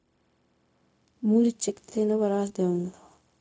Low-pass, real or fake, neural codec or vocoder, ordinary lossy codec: none; fake; codec, 16 kHz, 0.4 kbps, LongCat-Audio-Codec; none